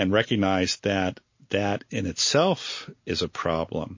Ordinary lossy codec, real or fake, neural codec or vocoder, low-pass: MP3, 32 kbps; real; none; 7.2 kHz